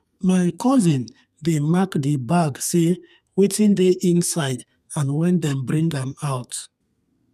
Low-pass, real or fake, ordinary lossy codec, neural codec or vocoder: 14.4 kHz; fake; none; codec, 32 kHz, 1.9 kbps, SNAC